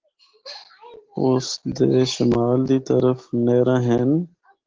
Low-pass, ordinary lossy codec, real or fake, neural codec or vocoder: 7.2 kHz; Opus, 16 kbps; real; none